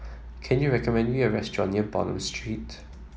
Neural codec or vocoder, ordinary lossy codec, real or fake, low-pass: none; none; real; none